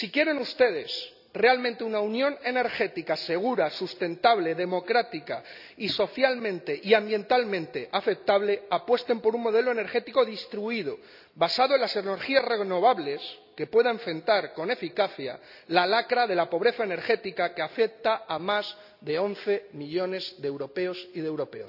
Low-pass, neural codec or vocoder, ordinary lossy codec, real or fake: 5.4 kHz; none; none; real